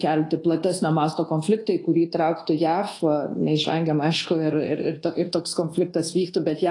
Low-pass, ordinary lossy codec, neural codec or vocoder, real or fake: 10.8 kHz; AAC, 32 kbps; codec, 24 kHz, 1.2 kbps, DualCodec; fake